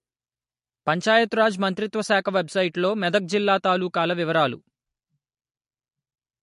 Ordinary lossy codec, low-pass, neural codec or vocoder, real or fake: MP3, 48 kbps; 14.4 kHz; none; real